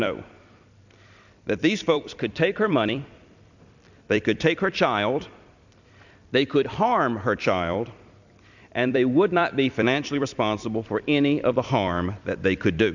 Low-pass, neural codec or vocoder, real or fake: 7.2 kHz; vocoder, 44.1 kHz, 128 mel bands every 256 samples, BigVGAN v2; fake